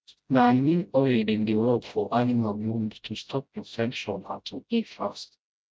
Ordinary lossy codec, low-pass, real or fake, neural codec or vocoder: none; none; fake; codec, 16 kHz, 0.5 kbps, FreqCodec, smaller model